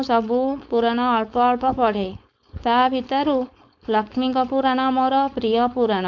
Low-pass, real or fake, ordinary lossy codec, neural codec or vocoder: 7.2 kHz; fake; MP3, 64 kbps; codec, 16 kHz, 4.8 kbps, FACodec